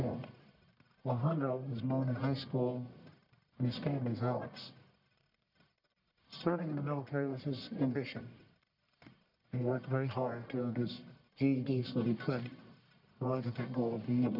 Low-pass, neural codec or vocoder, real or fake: 5.4 kHz; codec, 44.1 kHz, 1.7 kbps, Pupu-Codec; fake